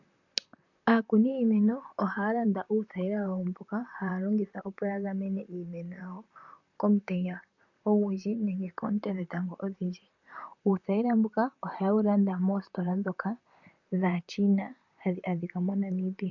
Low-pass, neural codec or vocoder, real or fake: 7.2 kHz; vocoder, 44.1 kHz, 128 mel bands, Pupu-Vocoder; fake